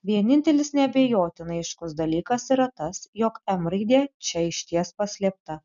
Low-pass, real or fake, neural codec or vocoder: 7.2 kHz; real; none